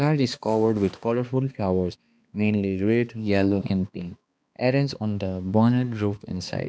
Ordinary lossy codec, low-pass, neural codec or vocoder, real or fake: none; none; codec, 16 kHz, 2 kbps, X-Codec, HuBERT features, trained on balanced general audio; fake